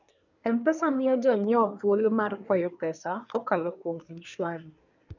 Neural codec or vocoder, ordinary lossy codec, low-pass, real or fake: codec, 24 kHz, 1 kbps, SNAC; none; 7.2 kHz; fake